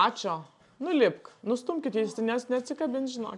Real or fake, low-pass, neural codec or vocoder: real; 10.8 kHz; none